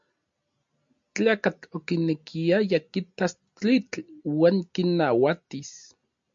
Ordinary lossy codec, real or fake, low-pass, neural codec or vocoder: MP3, 48 kbps; real; 7.2 kHz; none